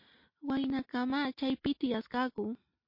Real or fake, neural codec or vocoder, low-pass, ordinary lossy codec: real; none; 5.4 kHz; MP3, 32 kbps